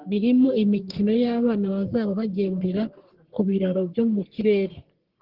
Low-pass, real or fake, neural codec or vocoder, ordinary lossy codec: 5.4 kHz; fake; codec, 44.1 kHz, 3.4 kbps, Pupu-Codec; Opus, 16 kbps